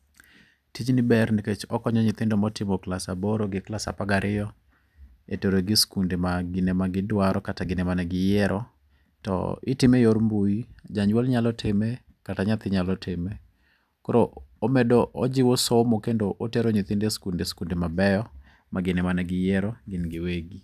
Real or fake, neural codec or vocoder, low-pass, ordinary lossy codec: real; none; 14.4 kHz; none